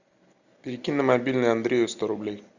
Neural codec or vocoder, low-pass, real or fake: none; 7.2 kHz; real